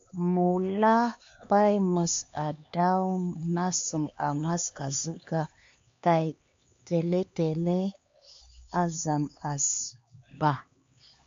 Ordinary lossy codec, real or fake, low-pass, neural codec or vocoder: MP3, 48 kbps; fake; 7.2 kHz; codec, 16 kHz, 2 kbps, X-Codec, HuBERT features, trained on LibriSpeech